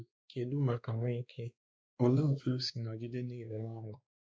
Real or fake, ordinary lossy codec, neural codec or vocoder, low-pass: fake; none; codec, 16 kHz, 2 kbps, X-Codec, WavLM features, trained on Multilingual LibriSpeech; none